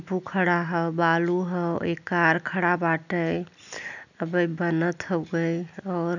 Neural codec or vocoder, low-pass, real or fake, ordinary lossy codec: none; 7.2 kHz; real; none